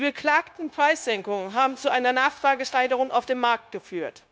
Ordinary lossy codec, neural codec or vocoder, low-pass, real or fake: none; codec, 16 kHz, 0.9 kbps, LongCat-Audio-Codec; none; fake